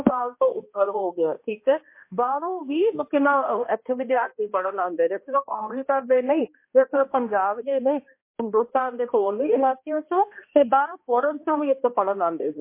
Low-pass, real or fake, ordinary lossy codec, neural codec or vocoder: 3.6 kHz; fake; MP3, 24 kbps; codec, 16 kHz, 1 kbps, X-Codec, HuBERT features, trained on balanced general audio